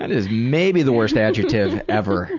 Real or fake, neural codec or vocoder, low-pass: real; none; 7.2 kHz